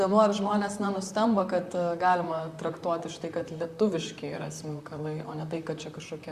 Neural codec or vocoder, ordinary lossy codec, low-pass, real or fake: vocoder, 44.1 kHz, 128 mel bands, Pupu-Vocoder; Opus, 64 kbps; 14.4 kHz; fake